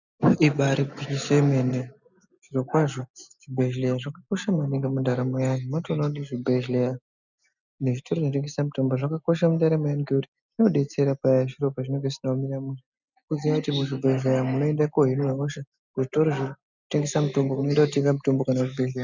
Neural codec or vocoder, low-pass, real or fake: none; 7.2 kHz; real